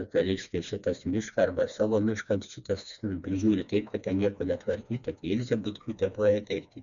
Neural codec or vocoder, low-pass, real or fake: codec, 16 kHz, 2 kbps, FreqCodec, smaller model; 7.2 kHz; fake